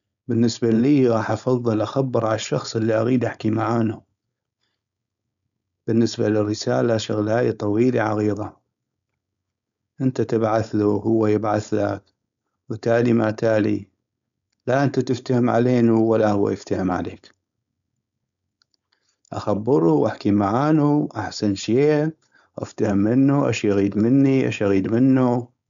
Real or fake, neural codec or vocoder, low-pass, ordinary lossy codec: fake; codec, 16 kHz, 4.8 kbps, FACodec; 7.2 kHz; none